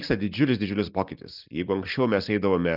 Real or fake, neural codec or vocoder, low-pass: fake; vocoder, 44.1 kHz, 128 mel bands every 256 samples, BigVGAN v2; 5.4 kHz